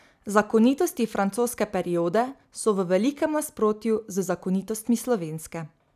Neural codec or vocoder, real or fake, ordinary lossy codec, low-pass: none; real; none; 14.4 kHz